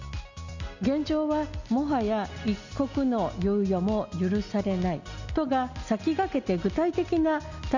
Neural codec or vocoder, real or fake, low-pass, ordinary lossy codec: none; real; 7.2 kHz; Opus, 64 kbps